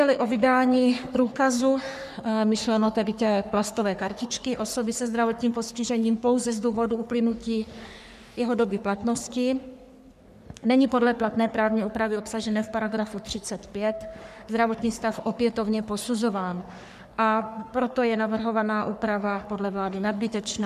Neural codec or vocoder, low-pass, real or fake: codec, 44.1 kHz, 3.4 kbps, Pupu-Codec; 14.4 kHz; fake